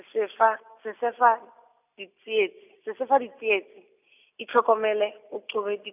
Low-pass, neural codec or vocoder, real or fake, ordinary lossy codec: 3.6 kHz; none; real; none